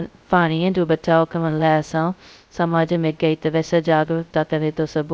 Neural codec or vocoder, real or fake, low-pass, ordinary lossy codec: codec, 16 kHz, 0.2 kbps, FocalCodec; fake; none; none